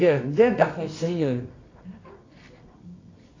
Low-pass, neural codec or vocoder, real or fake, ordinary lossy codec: 7.2 kHz; codec, 24 kHz, 0.9 kbps, WavTokenizer, medium music audio release; fake; AAC, 32 kbps